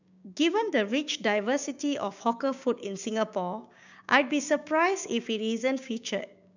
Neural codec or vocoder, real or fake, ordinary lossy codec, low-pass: codec, 16 kHz, 6 kbps, DAC; fake; none; 7.2 kHz